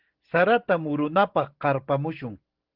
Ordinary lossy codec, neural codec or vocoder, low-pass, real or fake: Opus, 16 kbps; none; 5.4 kHz; real